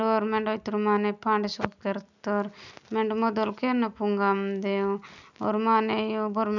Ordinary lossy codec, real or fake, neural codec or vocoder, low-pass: none; real; none; 7.2 kHz